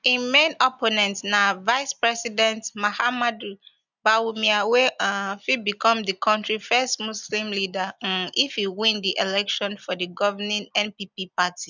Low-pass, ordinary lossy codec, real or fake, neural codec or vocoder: 7.2 kHz; none; real; none